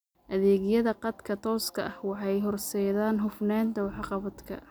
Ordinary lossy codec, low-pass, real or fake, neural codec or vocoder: none; none; real; none